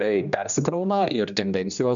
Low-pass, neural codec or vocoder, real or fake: 7.2 kHz; codec, 16 kHz, 1 kbps, X-Codec, HuBERT features, trained on general audio; fake